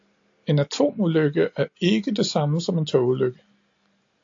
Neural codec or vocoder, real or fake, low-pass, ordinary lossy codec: none; real; 7.2 kHz; AAC, 48 kbps